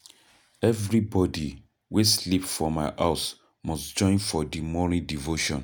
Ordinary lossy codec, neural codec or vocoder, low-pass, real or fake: none; none; none; real